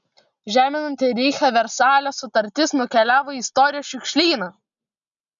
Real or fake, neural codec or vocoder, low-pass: real; none; 7.2 kHz